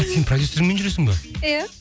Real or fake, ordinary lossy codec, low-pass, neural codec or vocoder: real; none; none; none